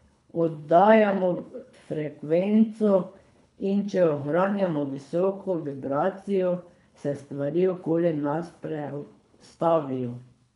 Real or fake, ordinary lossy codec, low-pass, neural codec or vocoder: fake; MP3, 96 kbps; 10.8 kHz; codec, 24 kHz, 3 kbps, HILCodec